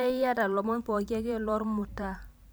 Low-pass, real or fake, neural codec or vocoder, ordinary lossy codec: none; fake; vocoder, 44.1 kHz, 128 mel bands every 512 samples, BigVGAN v2; none